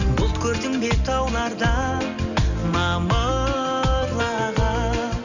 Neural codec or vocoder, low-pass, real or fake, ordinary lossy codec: none; 7.2 kHz; real; none